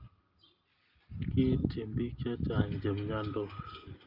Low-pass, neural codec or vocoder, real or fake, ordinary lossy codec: 5.4 kHz; none; real; Opus, 32 kbps